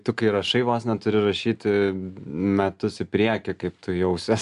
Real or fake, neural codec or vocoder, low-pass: real; none; 10.8 kHz